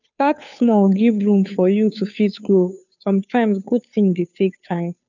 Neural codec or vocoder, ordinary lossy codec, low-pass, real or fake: codec, 16 kHz, 2 kbps, FunCodec, trained on Chinese and English, 25 frames a second; none; 7.2 kHz; fake